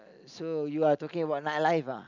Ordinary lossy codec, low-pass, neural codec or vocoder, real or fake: none; 7.2 kHz; none; real